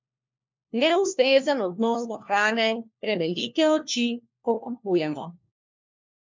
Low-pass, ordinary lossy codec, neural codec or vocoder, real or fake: 7.2 kHz; MP3, 64 kbps; codec, 16 kHz, 1 kbps, FunCodec, trained on LibriTTS, 50 frames a second; fake